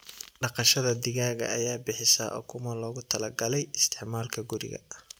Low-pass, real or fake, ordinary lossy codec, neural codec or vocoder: none; real; none; none